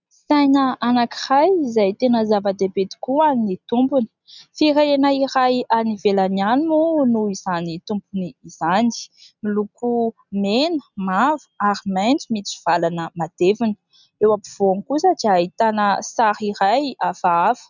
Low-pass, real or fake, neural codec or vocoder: 7.2 kHz; real; none